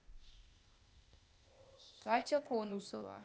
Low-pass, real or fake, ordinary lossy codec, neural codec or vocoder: none; fake; none; codec, 16 kHz, 0.8 kbps, ZipCodec